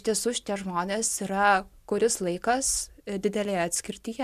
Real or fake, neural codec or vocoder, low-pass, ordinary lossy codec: real; none; 14.4 kHz; MP3, 96 kbps